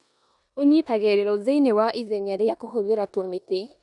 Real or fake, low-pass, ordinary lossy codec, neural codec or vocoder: fake; 10.8 kHz; none; codec, 16 kHz in and 24 kHz out, 0.9 kbps, LongCat-Audio-Codec, four codebook decoder